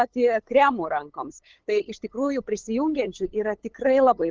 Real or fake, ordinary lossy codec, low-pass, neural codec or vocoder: fake; Opus, 16 kbps; 7.2 kHz; codec, 16 kHz, 8 kbps, FunCodec, trained on LibriTTS, 25 frames a second